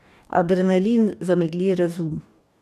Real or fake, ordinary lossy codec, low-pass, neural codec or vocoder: fake; none; 14.4 kHz; codec, 44.1 kHz, 2.6 kbps, DAC